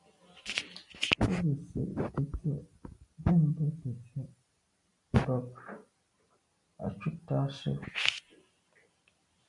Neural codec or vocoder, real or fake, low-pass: none; real; 10.8 kHz